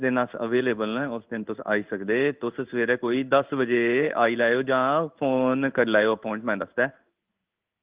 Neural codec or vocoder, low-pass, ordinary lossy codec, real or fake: codec, 16 kHz in and 24 kHz out, 1 kbps, XY-Tokenizer; 3.6 kHz; Opus, 32 kbps; fake